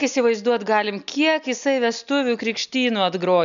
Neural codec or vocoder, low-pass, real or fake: none; 7.2 kHz; real